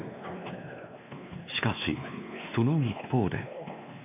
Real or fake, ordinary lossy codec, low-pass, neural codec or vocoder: fake; none; 3.6 kHz; codec, 16 kHz, 2 kbps, X-Codec, WavLM features, trained on Multilingual LibriSpeech